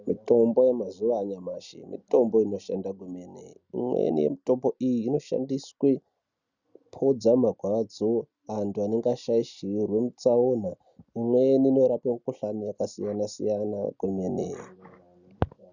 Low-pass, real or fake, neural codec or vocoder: 7.2 kHz; real; none